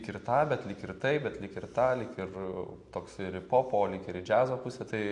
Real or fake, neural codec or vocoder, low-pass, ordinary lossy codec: real; none; 10.8 kHz; MP3, 48 kbps